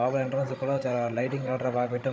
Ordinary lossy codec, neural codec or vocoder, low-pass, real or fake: none; codec, 16 kHz, 16 kbps, FreqCodec, larger model; none; fake